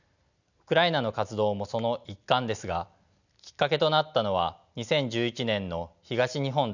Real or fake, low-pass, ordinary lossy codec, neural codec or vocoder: real; 7.2 kHz; none; none